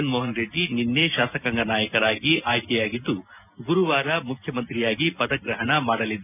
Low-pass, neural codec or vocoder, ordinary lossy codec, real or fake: 3.6 kHz; none; none; real